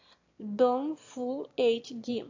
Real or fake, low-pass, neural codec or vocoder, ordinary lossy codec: fake; 7.2 kHz; autoencoder, 22.05 kHz, a latent of 192 numbers a frame, VITS, trained on one speaker; AAC, 48 kbps